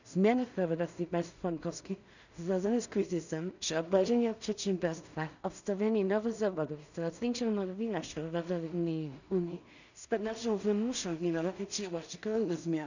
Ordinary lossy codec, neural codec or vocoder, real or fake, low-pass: none; codec, 16 kHz in and 24 kHz out, 0.4 kbps, LongCat-Audio-Codec, two codebook decoder; fake; 7.2 kHz